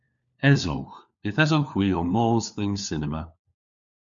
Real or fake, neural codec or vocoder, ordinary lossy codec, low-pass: fake; codec, 16 kHz, 4 kbps, FunCodec, trained on LibriTTS, 50 frames a second; MP3, 64 kbps; 7.2 kHz